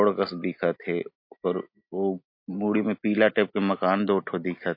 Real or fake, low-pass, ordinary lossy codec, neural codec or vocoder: real; 5.4 kHz; MP3, 32 kbps; none